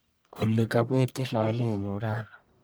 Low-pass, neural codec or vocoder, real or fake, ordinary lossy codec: none; codec, 44.1 kHz, 1.7 kbps, Pupu-Codec; fake; none